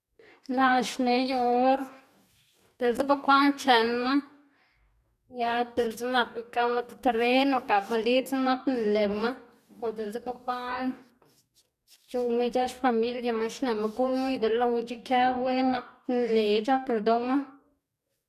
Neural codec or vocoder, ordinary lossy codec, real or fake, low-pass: codec, 44.1 kHz, 2.6 kbps, DAC; none; fake; 14.4 kHz